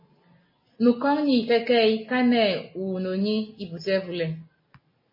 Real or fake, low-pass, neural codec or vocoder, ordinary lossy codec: real; 5.4 kHz; none; MP3, 24 kbps